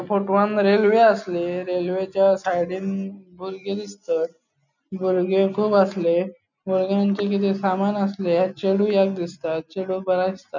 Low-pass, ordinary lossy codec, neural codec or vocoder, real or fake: 7.2 kHz; none; none; real